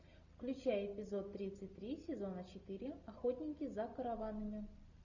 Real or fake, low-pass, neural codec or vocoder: real; 7.2 kHz; none